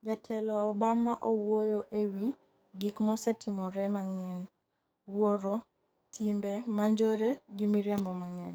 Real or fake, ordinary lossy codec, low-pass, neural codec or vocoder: fake; none; none; codec, 44.1 kHz, 2.6 kbps, SNAC